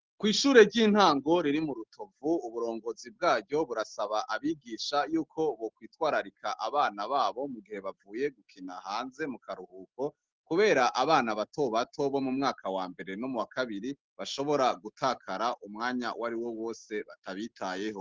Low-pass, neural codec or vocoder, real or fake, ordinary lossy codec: 7.2 kHz; none; real; Opus, 16 kbps